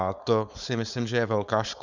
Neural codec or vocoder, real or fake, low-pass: codec, 16 kHz, 4.8 kbps, FACodec; fake; 7.2 kHz